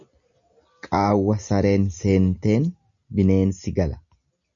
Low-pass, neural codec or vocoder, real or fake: 7.2 kHz; none; real